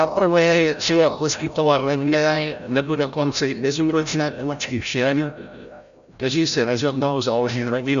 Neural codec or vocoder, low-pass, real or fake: codec, 16 kHz, 0.5 kbps, FreqCodec, larger model; 7.2 kHz; fake